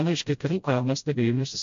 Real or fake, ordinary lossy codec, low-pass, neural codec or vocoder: fake; MP3, 48 kbps; 7.2 kHz; codec, 16 kHz, 0.5 kbps, FreqCodec, smaller model